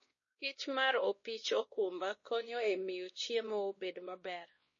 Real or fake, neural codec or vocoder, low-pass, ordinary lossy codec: fake; codec, 16 kHz, 1 kbps, X-Codec, WavLM features, trained on Multilingual LibriSpeech; 7.2 kHz; MP3, 32 kbps